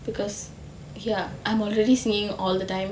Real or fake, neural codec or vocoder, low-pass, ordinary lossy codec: real; none; none; none